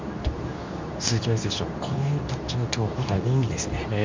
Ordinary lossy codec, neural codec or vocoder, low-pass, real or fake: MP3, 64 kbps; codec, 24 kHz, 0.9 kbps, WavTokenizer, medium speech release version 2; 7.2 kHz; fake